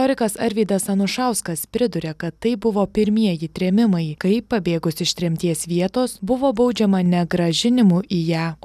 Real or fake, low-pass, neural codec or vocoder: real; 14.4 kHz; none